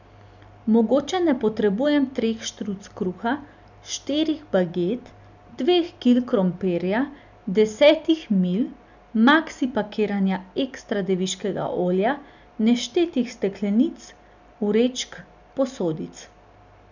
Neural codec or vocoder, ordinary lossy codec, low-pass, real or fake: none; none; 7.2 kHz; real